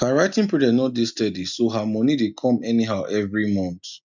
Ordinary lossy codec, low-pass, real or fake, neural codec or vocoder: none; 7.2 kHz; real; none